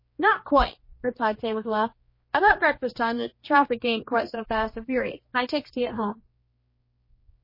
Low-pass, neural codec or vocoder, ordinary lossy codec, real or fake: 5.4 kHz; codec, 16 kHz, 2 kbps, X-Codec, HuBERT features, trained on general audio; MP3, 24 kbps; fake